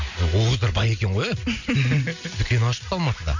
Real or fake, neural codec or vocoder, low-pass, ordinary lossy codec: real; none; 7.2 kHz; none